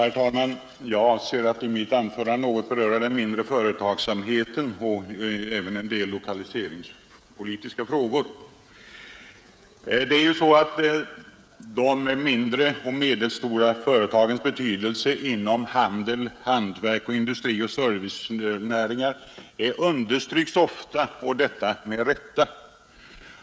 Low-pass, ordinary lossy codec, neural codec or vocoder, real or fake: none; none; codec, 16 kHz, 16 kbps, FreqCodec, smaller model; fake